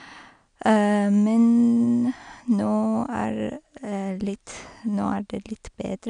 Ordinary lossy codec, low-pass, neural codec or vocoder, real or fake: none; 9.9 kHz; none; real